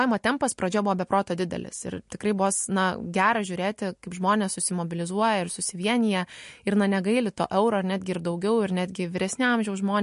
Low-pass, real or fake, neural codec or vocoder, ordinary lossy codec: 10.8 kHz; real; none; MP3, 48 kbps